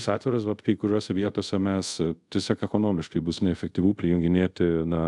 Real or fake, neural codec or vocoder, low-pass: fake; codec, 24 kHz, 0.5 kbps, DualCodec; 10.8 kHz